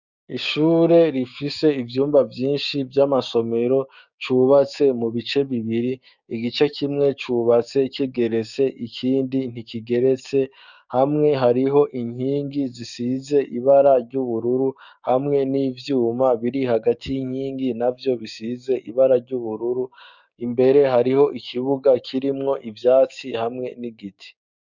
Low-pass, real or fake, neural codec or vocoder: 7.2 kHz; fake; codec, 16 kHz, 6 kbps, DAC